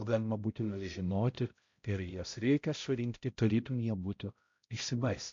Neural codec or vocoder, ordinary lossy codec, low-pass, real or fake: codec, 16 kHz, 0.5 kbps, X-Codec, HuBERT features, trained on balanced general audio; MP3, 48 kbps; 7.2 kHz; fake